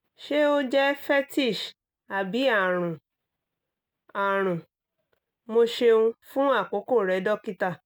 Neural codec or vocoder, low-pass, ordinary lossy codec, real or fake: none; none; none; real